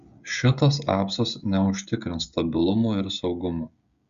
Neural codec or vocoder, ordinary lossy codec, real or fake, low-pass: codec, 16 kHz, 16 kbps, FreqCodec, smaller model; Opus, 64 kbps; fake; 7.2 kHz